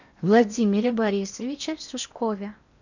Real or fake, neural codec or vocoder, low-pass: fake; codec, 16 kHz in and 24 kHz out, 0.8 kbps, FocalCodec, streaming, 65536 codes; 7.2 kHz